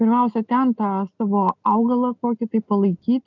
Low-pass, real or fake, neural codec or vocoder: 7.2 kHz; real; none